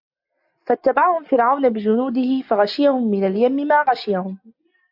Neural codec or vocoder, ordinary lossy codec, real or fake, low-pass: none; AAC, 48 kbps; real; 5.4 kHz